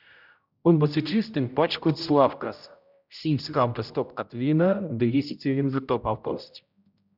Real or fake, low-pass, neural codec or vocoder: fake; 5.4 kHz; codec, 16 kHz, 0.5 kbps, X-Codec, HuBERT features, trained on general audio